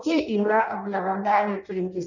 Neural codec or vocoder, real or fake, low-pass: codec, 16 kHz in and 24 kHz out, 0.6 kbps, FireRedTTS-2 codec; fake; 7.2 kHz